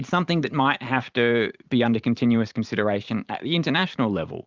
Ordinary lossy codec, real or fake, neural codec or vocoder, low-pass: Opus, 32 kbps; fake; vocoder, 44.1 kHz, 128 mel bands every 512 samples, BigVGAN v2; 7.2 kHz